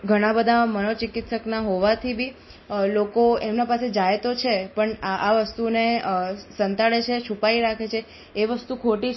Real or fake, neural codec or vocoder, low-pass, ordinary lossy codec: real; none; 7.2 kHz; MP3, 24 kbps